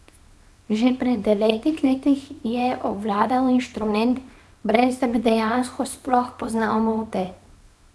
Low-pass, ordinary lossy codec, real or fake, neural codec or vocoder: none; none; fake; codec, 24 kHz, 0.9 kbps, WavTokenizer, small release